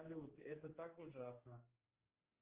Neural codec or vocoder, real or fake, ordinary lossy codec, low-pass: codec, 16 kHz, 2 kbps, X-Codec, HuBERT features, trained on general audio; fake; Opus, 16 kbps; 3.6 kHz